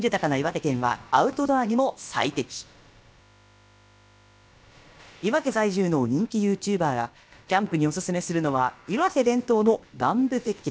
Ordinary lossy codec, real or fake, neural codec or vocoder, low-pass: none; fake; codec, 16 kHz, about 1 kbps, DyCAST, with the encoder's durations; none